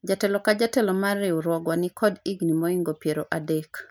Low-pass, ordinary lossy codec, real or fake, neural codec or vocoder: none; none; real; none